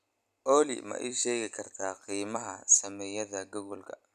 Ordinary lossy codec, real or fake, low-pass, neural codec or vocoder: none; fake; 14.4 kHz; vocoder, 44.1 kHz, 128 mel bands every 256 samples, BigVGAN v2